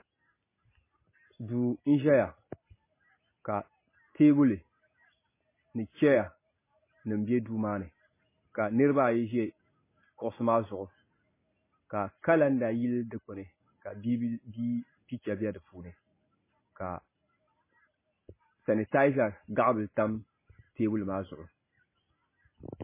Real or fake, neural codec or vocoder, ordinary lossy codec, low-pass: real; none; MP3, 16 kbps; 3.6 kHz